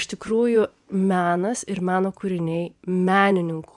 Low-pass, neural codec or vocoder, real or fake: 10.8 kHz; vocoder, 24 kHz, 100 mel bands, Vocos; fake